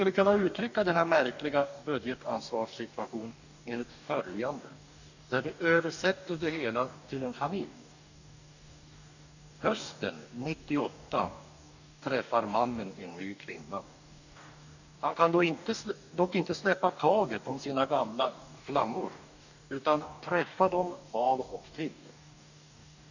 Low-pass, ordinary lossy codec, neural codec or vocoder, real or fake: 7.2 kHz; none; codec, 44.1 kHz, 2.6 kbps, DAC; fake